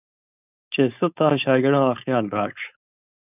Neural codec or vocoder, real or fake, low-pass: codec, 16 kHz, 4.8 kbps, FACodec; fake; 3.6 kHz